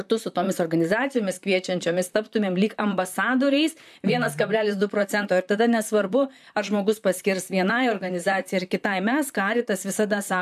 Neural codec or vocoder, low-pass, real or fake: vocoder, 44.1 kHz, 128 mel bands, Pupu-Vocoder; 14.4 kHz; fake